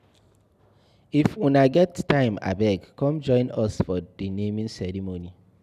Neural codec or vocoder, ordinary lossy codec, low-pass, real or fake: none; none; 14.4 kHz; real